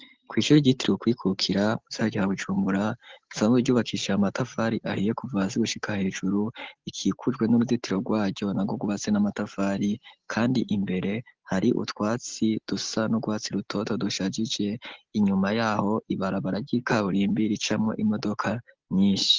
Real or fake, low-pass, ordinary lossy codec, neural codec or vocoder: real; 7.2 kHz; Opus, 16 kbps; none